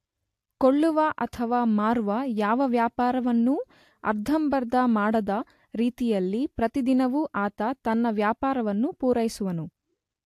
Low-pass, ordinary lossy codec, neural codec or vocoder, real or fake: 14.4 kHz; AAC, 64 kbps; none; real